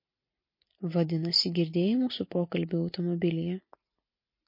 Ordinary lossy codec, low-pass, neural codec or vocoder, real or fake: MP3, 32 kbps; 5.4 kHz; none; real